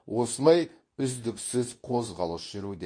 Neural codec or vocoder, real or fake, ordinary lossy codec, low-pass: codec, 24 kHz, 0.9 kbps, WavTokenizer, medium speech release version 2; fake; MP3, 48 kbps; 9.9 kHz